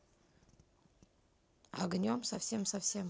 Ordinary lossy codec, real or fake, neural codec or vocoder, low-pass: none; real; none; none